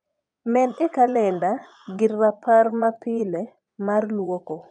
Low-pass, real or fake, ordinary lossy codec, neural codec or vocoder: 9.9 kHz; fake; none; vocoder, 22.05 kHz, 80 mel bands, Vocos